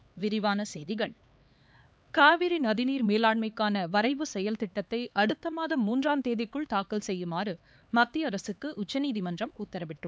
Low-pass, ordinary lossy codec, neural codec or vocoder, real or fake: none; none; codec, 16 kHz, 4 kbps, X-Codec, HuBERT features, trained on LibriSpeech; fake